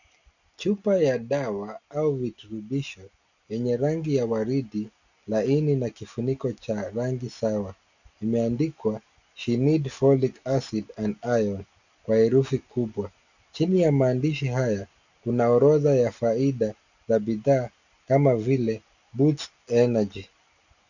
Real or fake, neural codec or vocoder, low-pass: real; none; 7.2 kHz